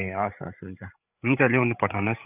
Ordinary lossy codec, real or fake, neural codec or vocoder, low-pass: none; fake; codec, 16 kHz, 8 kbps, FreqCodec, larger model; 3.6 kHz